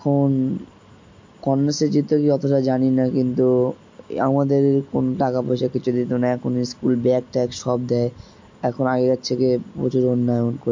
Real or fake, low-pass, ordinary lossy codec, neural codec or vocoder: real; 7.2 kHz; MP3, 48 kbps; none